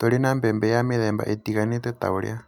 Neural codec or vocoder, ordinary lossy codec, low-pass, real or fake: none; none; 19.8 kHz; real